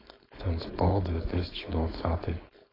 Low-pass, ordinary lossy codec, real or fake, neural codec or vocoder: 5.4 kHz; AAC, 24 kbps; fake; codec, 16 kHz, 4.8 kbps, FACodec